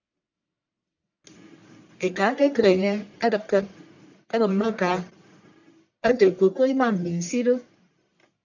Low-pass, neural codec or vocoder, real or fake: 7.2 kHz; codec, 44.1 kHz, 1.7 kbps, Pupu-Codec; fake